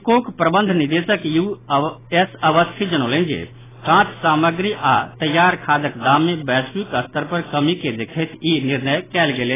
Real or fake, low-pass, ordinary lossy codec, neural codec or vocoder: real; 3.6 kHz; AAC, 16 kbps; none